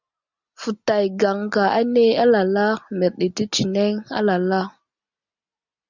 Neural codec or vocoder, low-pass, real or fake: none; 7.2 kHz; real